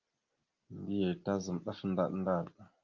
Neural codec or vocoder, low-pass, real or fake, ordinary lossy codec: none; 7.2 kHz; real; Opus, 32 kbps